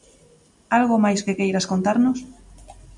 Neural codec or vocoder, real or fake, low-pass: none; real; 10.8 kHz